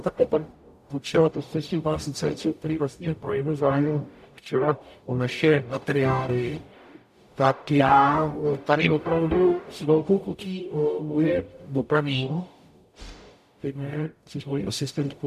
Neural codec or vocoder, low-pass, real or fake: codec, 44.1 kHz, 0.9 kbps, DAC; 14.4 kHz; fake